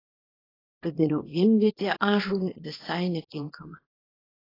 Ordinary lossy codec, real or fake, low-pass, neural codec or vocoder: AAC, 24 kbps; fake; 5.4 kHz; codec, 24 kHz, 0.9 kbps, WavTokenizer, small release